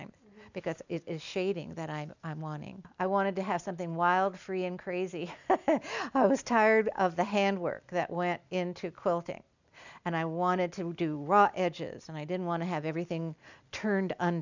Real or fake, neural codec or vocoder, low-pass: real; none; 7.2 kHz